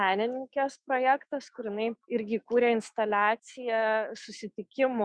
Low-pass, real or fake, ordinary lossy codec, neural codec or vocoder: 9.9 kHz; fake; Opus, 64 kbps; autoencoder, 48 kHz, 128 numbers a frame, DAC-VAE, trained on Japanese speech